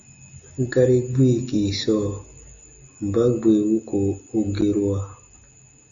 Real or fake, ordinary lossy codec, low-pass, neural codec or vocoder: real; Opus, 64 kbps; 7.2 kHz; none